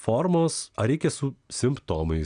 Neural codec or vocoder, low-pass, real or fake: none; 9.9 kHz; real